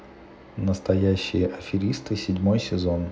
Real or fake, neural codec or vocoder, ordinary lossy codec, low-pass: real; none; none; none